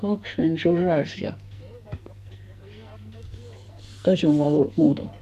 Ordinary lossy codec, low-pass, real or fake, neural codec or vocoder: none; 14.4 kHz; fake; codec, 44.1 kHz, 2.6 kbps, SNAC